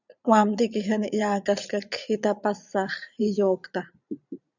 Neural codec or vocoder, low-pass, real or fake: vocoder, 44.1 kHz, 80 mel bands, Vocos; 7.2 kHz; fake